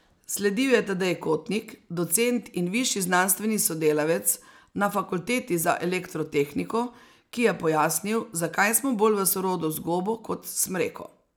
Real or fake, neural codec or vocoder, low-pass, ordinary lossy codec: real; none; none; none